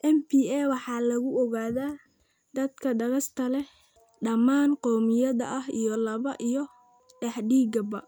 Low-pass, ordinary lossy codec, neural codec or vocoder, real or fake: none; none; none; real